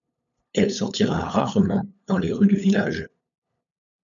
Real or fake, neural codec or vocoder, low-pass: fake; codec, 16 kHz, 8 kbps, FunCodec, trained on LibriTTS, 25 frames a second; 7.2 kHz